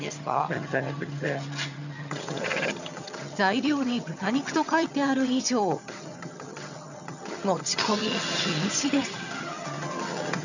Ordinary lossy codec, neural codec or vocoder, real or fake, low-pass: none; vocoder, 22.05 kHz, 80 mel bands, HiFi-GAN; fake; 7.2 kHz